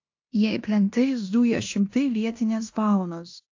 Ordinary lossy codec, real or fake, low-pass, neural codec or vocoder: AAC, 48 kbps; fake; 7.2 kHz; codec, 16 kHz in and 24 kHz out, 0.9 kbps, LongCat-Audio-Codec, fine tuned four codebook decoder